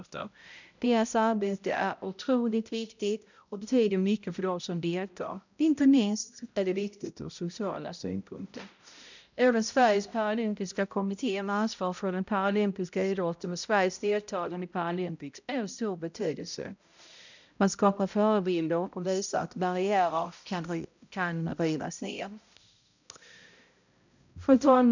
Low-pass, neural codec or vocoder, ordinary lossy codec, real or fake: 7.2 kHz; codec, 16 kHz, 0.5 kbps, X-Codec, HuBERT features, trained on balanced general audio; none; fake